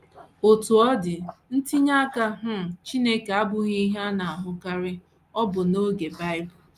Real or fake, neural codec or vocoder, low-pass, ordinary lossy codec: real; none; 14.4 kHz; Opus, 32 kbps